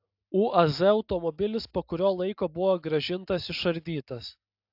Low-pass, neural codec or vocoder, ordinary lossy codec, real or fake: 5.4 kHz; none; AAC, 48 kbps; real